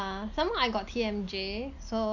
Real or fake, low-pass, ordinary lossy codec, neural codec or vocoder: real; 7.2 kHz; none; none